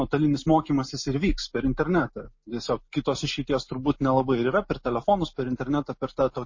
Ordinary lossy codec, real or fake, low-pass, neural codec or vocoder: MP3, 32 kbps; real; 7.2 kHz; none